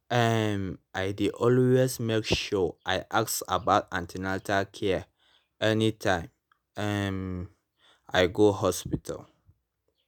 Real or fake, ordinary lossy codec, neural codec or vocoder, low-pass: real; none; none; none